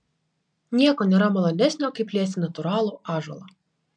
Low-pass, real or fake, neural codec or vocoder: 9.9 kHz; real; none